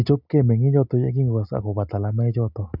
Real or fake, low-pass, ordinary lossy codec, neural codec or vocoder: real; 5.4 kHz; none; none